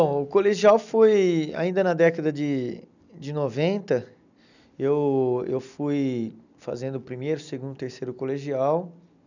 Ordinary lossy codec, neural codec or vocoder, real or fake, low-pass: none; none; real; 7.2 kHz